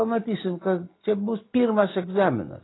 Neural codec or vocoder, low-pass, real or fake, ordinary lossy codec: none; 7.2 kHz; real; AAC, 16 kbps